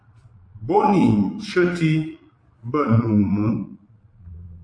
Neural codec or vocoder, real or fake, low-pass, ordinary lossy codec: vocoder, 22.05 kHz, 80 mel bands, Vocos; fake; 9.9 kHz; AAC, 48 kbps